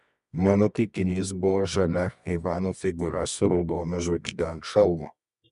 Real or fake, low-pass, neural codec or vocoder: fake; 10.8 kHz; codec, 24 kHz, 0.9 kbps, WavTokenizer, medium music audio release